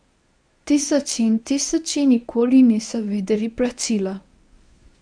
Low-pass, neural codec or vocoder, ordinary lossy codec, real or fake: 9.9 kHz; codec, 24 kHz, 0.9 kbps, WavTokenizer, medium speech release version 1; none; fake